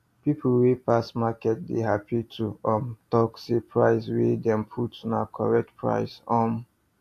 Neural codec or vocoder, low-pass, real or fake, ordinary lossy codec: none; 14.4 kHz; real; AAC, 64 kbps